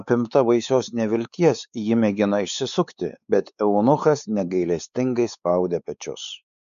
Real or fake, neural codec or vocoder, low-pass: fake; codec, 16 kHz, 4 kbps, X-Codec, WavLM features, trained on Multilingual LibriSpeech; 7.2 kHz